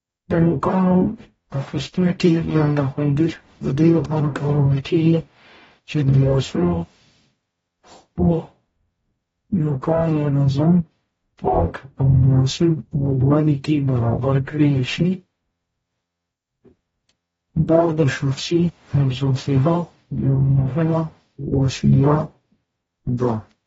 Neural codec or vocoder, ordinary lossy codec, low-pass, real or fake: codec, 44.1 kHz, 0.9 kbps, DAC; AAC, 24 kbps; 19.8 kHz; fake